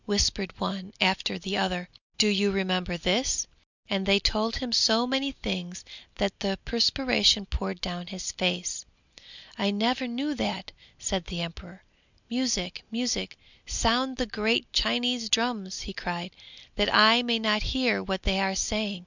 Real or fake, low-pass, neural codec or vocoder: real; 7.2 kHz; none